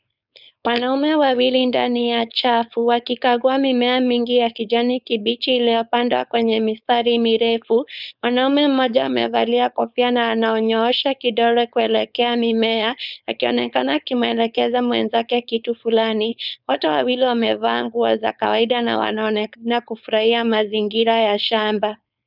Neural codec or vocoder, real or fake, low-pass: codec, 16 kHz, 4.8 kbps, FACodec; fake; 5.4 kHz